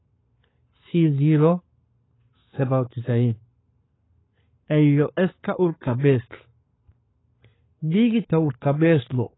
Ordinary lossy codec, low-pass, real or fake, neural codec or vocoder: AAC, 16 kbps; 7.2 kHz; fake; codec, 44.1 kHz, 7.8 kbps, Pupu-Codec